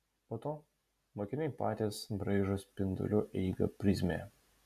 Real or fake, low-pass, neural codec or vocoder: real; 14.4 kHz; none